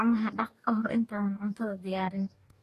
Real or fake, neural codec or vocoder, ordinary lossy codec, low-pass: fake; codec, 44.1 kHz, 2.6 kbps, DAC; AAC, 48 kbps; 14.4 kHz